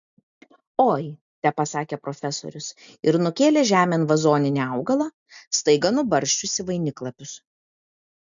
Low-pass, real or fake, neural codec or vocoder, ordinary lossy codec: 7.2 kHz; real; none; MP3, 64 kbps